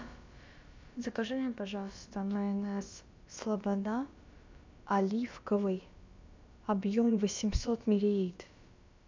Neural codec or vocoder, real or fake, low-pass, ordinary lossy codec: codec, 16 kHz, about 1 kbps, DyCAST, with the encoder's durations; fake; 7.2 kHz; MP3, 64 kbps